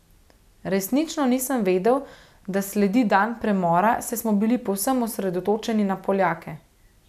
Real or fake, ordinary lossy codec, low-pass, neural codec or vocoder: real; none; 14.4 kHz; none